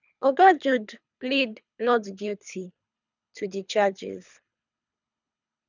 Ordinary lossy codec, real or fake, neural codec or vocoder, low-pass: none; fake; codec, 24 kHz, 3 kbps, HILCodec; 7.2 kHz